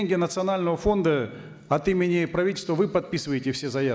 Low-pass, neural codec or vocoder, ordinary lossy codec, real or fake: none; none; none; real